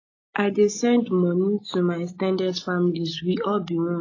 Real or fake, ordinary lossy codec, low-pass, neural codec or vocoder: real; AAC, 32 kbps; 7.2 kHz; none